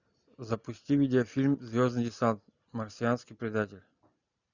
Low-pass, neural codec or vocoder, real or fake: 7.2 kHz; none; real